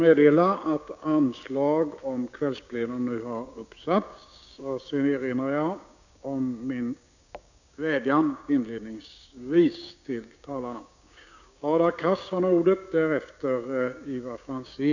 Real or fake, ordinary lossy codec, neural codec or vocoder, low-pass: fake; none; codec, 16 kHz, 6 kbps, DAC; 7.2 kHz